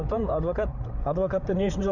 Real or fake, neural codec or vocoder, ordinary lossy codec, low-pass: fake; codec, 16 kHz, 8 kbps, FreqCodec, larger model; none; 7.2 kHz